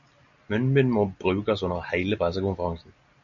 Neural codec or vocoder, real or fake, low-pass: none; real; 7.2 kHz